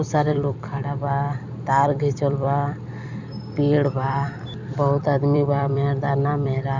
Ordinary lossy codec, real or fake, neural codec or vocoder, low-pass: none; fake; vocoder, 44.1 kHz, 128 mel bands every 256 samples, BigVGAN v2; 7.2 kHz